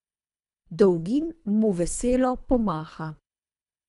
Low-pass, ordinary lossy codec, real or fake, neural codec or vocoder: 10.8 kHz; none; fake; codec, 24 kHz, 3 kbps, HILCodec